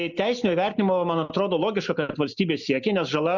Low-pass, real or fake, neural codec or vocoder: 7.2 kHz; real; none